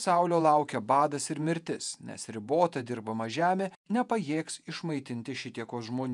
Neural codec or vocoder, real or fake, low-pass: vocoder, 48 kHz, 128 mel bands, Vocos; fake; 10.8 kHz